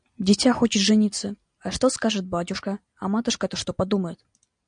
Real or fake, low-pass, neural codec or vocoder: real; 9.9 kHz; none